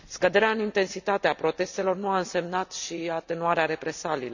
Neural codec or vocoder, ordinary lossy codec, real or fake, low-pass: none; none; real; 7.2 kHz